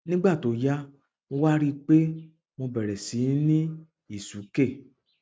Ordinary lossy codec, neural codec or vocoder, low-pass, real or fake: none; none; none; real